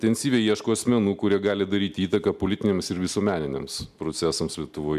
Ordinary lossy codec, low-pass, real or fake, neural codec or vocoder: MP3, 96 kbps; 14.4 kHz; real; none